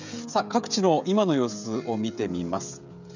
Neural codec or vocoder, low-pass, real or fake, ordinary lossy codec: codec, 16 kHz, 16 kbps, FreqCodec, smaller model; 7.2 kHz; fake; none